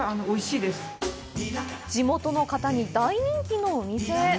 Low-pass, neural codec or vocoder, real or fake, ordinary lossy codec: none; none; real; none